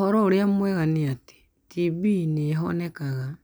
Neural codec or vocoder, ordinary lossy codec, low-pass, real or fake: none; none; none; real